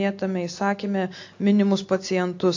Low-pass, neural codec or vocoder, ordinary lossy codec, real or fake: 7.2 kHz; none; AAC, 48 kbps; real